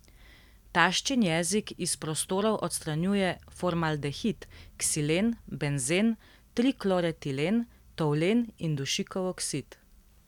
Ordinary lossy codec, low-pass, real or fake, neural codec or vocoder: none; 19.8 kHz; real; none